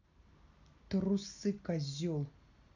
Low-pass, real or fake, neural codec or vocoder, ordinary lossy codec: 7.2 kHz; real; none; none